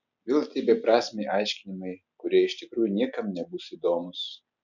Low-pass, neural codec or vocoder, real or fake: 7.2 kHz; none; real